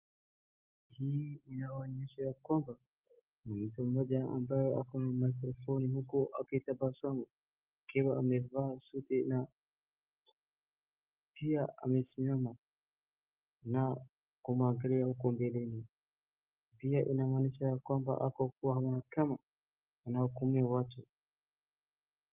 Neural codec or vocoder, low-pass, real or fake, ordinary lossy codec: none; 3.6 kHz; real; Opus, 24 kbps